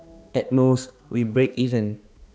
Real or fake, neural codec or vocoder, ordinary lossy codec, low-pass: fake; codec, 16 kHz, 2 kbps, X-Codec, HuBERT features, trained on balanced general audio; none; none